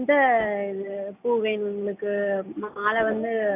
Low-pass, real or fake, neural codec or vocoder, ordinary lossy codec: 3.6 kHz; real; none; none